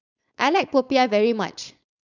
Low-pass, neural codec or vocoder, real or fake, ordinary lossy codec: 7.2 kHz; codec, 16 kHz, 4.8 kbps, FACodec; fake; none